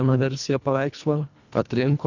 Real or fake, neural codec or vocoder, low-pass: fake; codec, 24 kHz, 1.5 kbps, HILCodec; 7.2 kHz